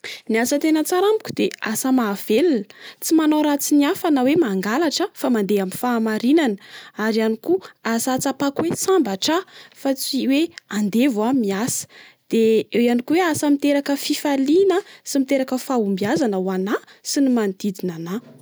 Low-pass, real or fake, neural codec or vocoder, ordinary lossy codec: none; real; none; none